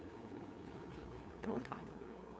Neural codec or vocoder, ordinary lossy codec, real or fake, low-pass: codec, 16 kHz, 2 kbps, FunCodec, trained on LibriTTS, 25 frames a second; none; fake; none